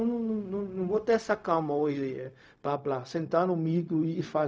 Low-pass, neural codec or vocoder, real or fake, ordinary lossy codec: none; codec, 16 kHz, 0.4 kbps, LongCat-Audio-Codec; fake; none